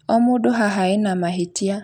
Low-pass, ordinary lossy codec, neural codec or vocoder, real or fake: 19.8 kHz; none; none; real